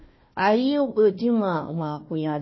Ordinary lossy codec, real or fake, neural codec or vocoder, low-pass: MP3, 24 kbps; fake; codec, 16 kHz, 1 kbps, FunCodec, trained on Chinese and English, 50 frames a second; 7.2 kHz